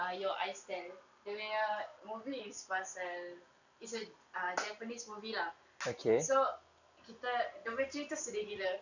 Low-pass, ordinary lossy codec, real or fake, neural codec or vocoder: 7.2 kHz; none; real; none